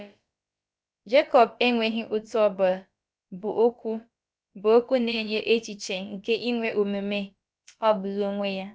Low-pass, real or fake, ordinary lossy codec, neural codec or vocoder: none; fake; none; codec, 16 kHz, about 1 kbps, DyCAST, with the encoder's durations